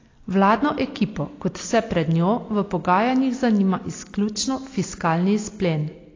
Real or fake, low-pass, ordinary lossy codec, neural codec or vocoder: real; 7.2 kHz; AAC, 32 kbps; none